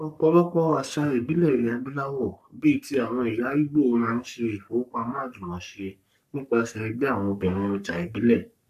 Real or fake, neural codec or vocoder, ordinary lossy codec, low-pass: fake; codec, 44.1 kHz, 3.4 kbps, Pupu-Codec; none; 14.4 kHz